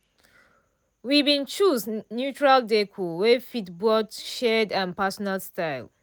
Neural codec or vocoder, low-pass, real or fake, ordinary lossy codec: none; none; real; none